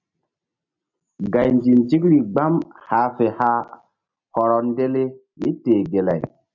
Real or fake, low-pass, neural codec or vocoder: real; 7.2 kHz; none